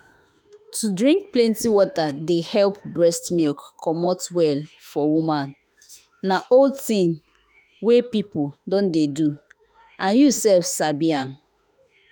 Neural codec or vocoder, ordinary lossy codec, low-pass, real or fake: autoencoder, 48 kHz, 32 numbers a frame, DAC-VAE, trained on Japanese speech; none; none; fake